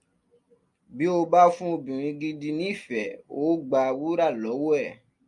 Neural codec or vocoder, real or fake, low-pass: none; real; 10.8 kHz